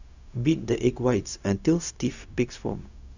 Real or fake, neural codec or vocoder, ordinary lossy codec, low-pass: fake; codec, 16 kHz, 0.4 kbps, LongCat-Audio-Codec; none; 7.2 kHz